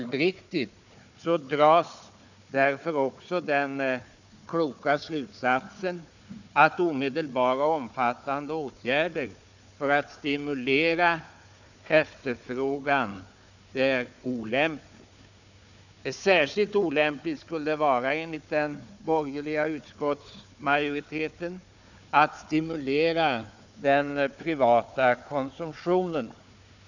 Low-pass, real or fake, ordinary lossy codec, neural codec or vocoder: 7.2 kHz; fake; none; codec, 16 kHz, 4 kbps, FunCodec, trained on Chinese and English, 50 frames a second